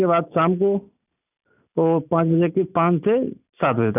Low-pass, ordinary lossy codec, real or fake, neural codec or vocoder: 3.6 kHz; none; real; none